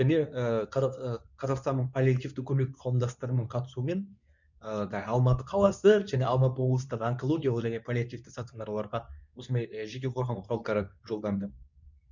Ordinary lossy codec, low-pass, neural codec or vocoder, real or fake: none; 7.2 kHz; codec, 24 kHz, 0.9 kbps, WavTokenizer, medium speech release version 2; fake